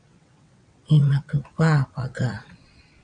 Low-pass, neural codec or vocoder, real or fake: 9.9 kHz; vocoder, 22.05 kHz, 80 mel bands, WaveNeXt; fake